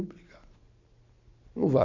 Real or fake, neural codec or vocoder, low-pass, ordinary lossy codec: real; none; 7.2 kHz; none